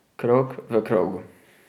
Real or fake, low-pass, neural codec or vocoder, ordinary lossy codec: real; 19.8 kHz; none; none